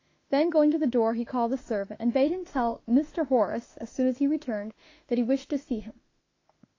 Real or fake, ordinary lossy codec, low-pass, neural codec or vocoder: fake; AAC, 32 kbps; 7.2 kHz; autoencoder, 48 kHz, 32 numbers a frame, DAC-VAE, trained on Japanese speech